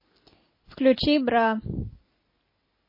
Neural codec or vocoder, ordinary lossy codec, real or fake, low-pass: none; MP3, 24 kbps; real; 5.4 kHz